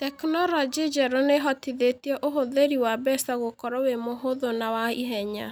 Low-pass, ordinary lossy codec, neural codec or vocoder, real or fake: none; none; none; real